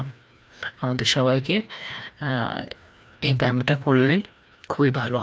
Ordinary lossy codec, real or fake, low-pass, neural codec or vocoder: none; fake; none; codec, 16 kHz, 1 kbps, FreqCodec, larger model